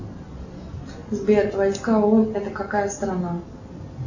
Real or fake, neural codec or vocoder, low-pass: real; none; 7.2 kHz